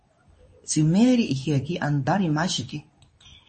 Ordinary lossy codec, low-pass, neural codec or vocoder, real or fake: MP3, 32 kbps; 10.8 kHz; codec, 24 kHz, 0.9 kbps, WavTokenizer, medium speech release version 2; fake